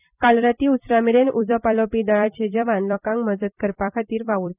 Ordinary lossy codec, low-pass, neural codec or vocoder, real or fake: none; 3.6 kHz; vocoder, 44.1 kHz, 128 mel bands every 256 samples, BigVGAN v2; fake